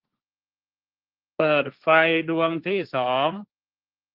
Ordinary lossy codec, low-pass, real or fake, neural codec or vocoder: Opus, 32 kbps; 5.4 kHz; fake; codec, 16 kHz, 1.1 kbps, Voila-Tokenizer